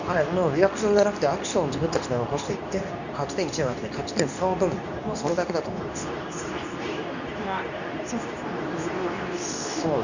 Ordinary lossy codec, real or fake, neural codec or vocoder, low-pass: none; fake; codec, 24 kHz, 0.9 kbps, WavTokenizer, medium speech release version 2; 7.2 kHz